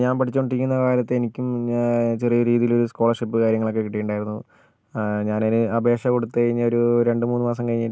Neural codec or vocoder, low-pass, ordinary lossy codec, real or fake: none; none; none; real